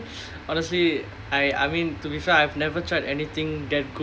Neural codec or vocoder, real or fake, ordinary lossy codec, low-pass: none; real; none; none